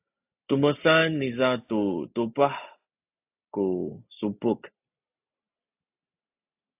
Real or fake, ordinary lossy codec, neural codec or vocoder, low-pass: real; AAC, 32 kbps; none; 3.6 kHz